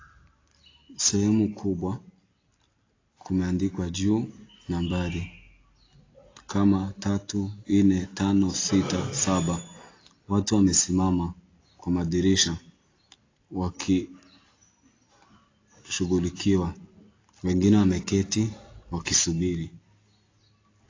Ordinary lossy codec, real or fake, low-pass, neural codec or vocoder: AAC, 32 kbps; real; 7.2 kHz; none